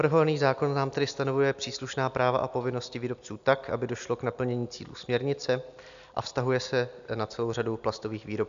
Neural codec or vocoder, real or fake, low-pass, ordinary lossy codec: none; real; 7.2 kHz; AAC, 96 kbps